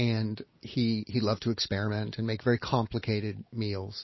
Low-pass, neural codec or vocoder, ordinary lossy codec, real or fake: 7.2 kHz; none; MP3, 24 kbps; real